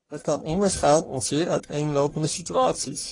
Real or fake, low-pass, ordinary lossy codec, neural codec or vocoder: fake; 10.8 kHz; AAC, 32 kbps; codec, 44.1 kHz, 1.7 kbps, Pupu-Codec